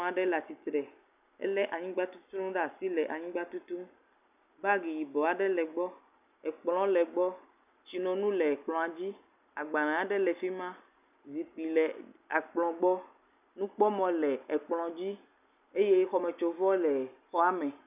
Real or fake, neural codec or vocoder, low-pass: real; none; 3.6 kHz